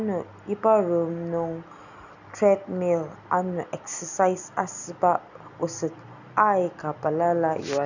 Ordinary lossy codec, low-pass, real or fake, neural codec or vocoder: none; 7.2 kHz; real; none